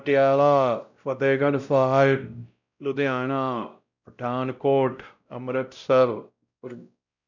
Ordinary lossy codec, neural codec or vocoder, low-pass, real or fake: none; codec, 16 kHz, 0.5 kbps, X-Codec, WavLM features, trained on Multilingual LibriSpeech; 7.2 kHz; fake